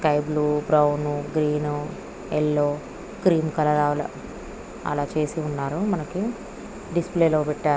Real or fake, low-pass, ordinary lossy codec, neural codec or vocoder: real; none; none; none